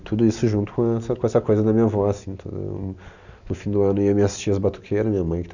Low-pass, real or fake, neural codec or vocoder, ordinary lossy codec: 7.2 kHz; real; none; none